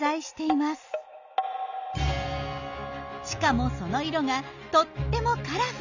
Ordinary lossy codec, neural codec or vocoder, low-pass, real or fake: none; none; 7.2 kHz; real